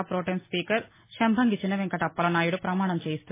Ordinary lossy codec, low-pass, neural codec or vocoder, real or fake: MP3, 16 kbps; 3.6 kHz; none; real